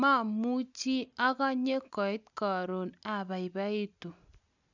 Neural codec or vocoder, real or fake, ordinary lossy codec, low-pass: none; real; none; 7.2 kHz